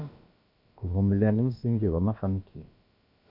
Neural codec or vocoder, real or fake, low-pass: codec, 16 kHz, about 1 kbps, DyCAST, with the encoder's durations; fake; 5.4 kHz